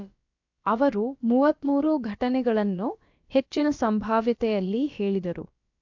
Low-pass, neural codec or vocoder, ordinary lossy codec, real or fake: 7.2 kHz; codec, 16 kHz, about 1 kbps, DyCAST, with the encoder's durations; AAC, 48 kbps; fake